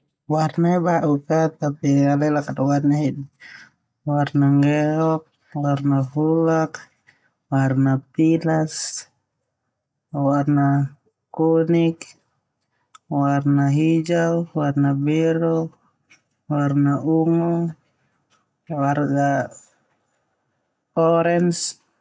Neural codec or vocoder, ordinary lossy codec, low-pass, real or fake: none; none; none; real